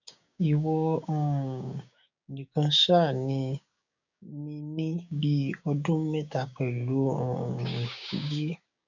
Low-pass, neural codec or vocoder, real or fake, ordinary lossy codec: 7.2 kHz; codec, 16 kHz, 6 kbps, DAC; fake; none